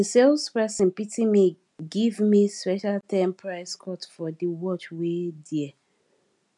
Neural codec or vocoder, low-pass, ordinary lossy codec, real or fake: none; 10.8 kHz; MP3, 96 kbps; real